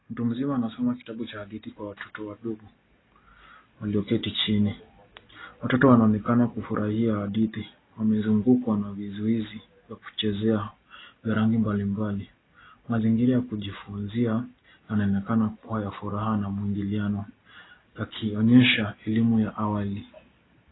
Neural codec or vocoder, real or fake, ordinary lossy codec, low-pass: none; real; AAC, 16 kbps; 7.2 kHz